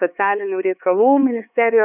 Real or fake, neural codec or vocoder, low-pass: fake; codec, 16 kHz, 4 kbps, X-Codec, HuBERT features, trained on LibriSpeech; 3.6 kHz